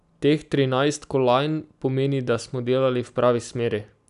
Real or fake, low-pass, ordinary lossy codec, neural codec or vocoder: real; 10.8 kHz; none; none